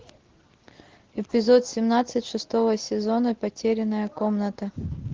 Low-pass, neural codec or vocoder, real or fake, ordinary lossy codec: 7.2 kHz; none; real; Opus, 16 kbps